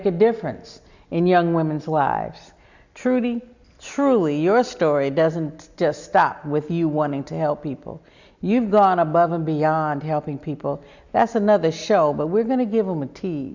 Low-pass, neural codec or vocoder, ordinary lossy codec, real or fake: 7.2 kHz; none; Opus, 64 kbps; real